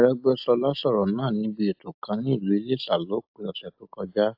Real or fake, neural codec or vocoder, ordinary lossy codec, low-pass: real; none; AAC, 48 kbps; 5.4 kHz